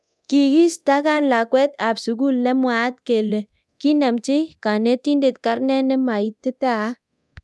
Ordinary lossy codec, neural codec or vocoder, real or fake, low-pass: none; codec, 24 kHz, 0.9 kbps, DualCodec; fake; none